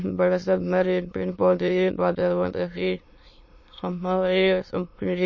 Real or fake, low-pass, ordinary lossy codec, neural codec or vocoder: fake; 7.2 kHz; MP3, 32 kbps; autoencoder, 22.05 kHz, a latent of 192 numbers a frame, VITS, trained on many speakers